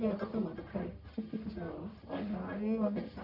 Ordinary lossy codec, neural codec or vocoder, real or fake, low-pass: none; codec, 44.1 kHz, 1.7 kbps, Pupu-Codec; fake; 5.4 kHz